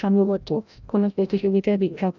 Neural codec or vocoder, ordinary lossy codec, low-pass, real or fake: codec, 16 kHz, 0.5 kbps, FreqCodec, larger model; none; 7.2 kHz; fake